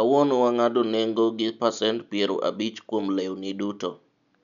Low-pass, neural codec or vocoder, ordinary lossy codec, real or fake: 7.2 kHz; none; none; real